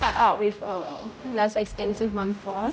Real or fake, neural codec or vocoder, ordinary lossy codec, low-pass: fake; codec, 16 kHz, 0.5 kbps, X-Codec, HuBERT features, trained on general audio; none; none